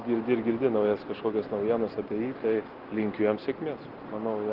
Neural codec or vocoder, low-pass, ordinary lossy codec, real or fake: none; 5.4 kHz; Opus, 16 kbps; real